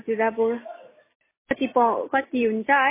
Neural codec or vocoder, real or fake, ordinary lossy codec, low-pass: none; real; MP3, 16 kbps; 3.6 kHz